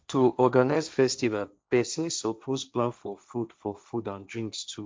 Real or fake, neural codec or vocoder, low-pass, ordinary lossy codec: fake; codec, 16 kHz, 1.1 kbps, Voila-Tokenizer; none; none